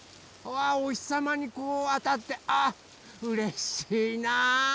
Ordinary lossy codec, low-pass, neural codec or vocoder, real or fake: none; none; none; real